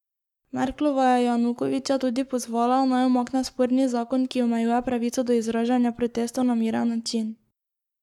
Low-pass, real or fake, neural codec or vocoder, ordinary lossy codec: 19.8 kHz; fake; codec, 44.1 kHz, 7.8 kbps, Pupu-Codec; none